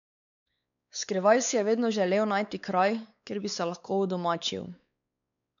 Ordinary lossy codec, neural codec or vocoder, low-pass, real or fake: none; codec, 16 kHz, 4 kbps, X-Codec, WavLM features, trained on Multilingual LibriSpeech; 7.2 kHz; fake